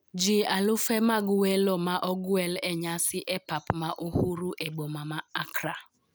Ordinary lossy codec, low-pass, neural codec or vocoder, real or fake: none; none; none; real